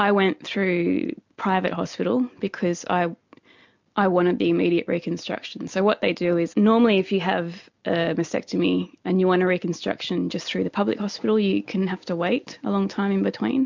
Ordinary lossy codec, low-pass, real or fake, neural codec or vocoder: MP3, 64 kbps; 7.2 kHz; real; none